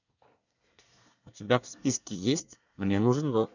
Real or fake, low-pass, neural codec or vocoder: fake; 7.2 kHz; codec, 24 kHz, 1 kbps, SNAC